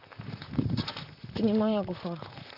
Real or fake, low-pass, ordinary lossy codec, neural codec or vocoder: fake; 5.4 kHz; none; vocoder, 44.1 kHz, 128 mel bands, Pupu-Vocoder